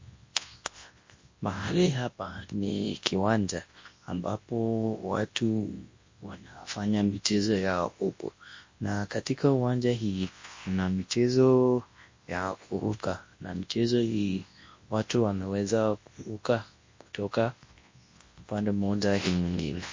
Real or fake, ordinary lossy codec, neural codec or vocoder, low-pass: fake; MP3, 32 kbps; codec, 24 kHz, 0.9 kbps, WavTokenizer, large speech release; 7.2 kHz